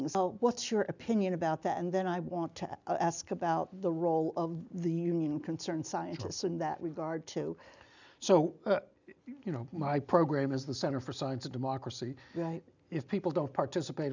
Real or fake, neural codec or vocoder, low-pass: real; none; 7.2 kHz